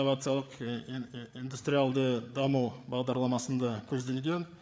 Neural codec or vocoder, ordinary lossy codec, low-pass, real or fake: codec, 16 kHz, 8 kbps, FreqCodec, larger model; none; none; fake